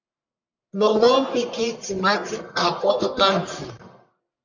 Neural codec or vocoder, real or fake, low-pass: codec, 44.1 kHz, 1.7 kbps, Pupu-Codec; fake; 7.2 kHz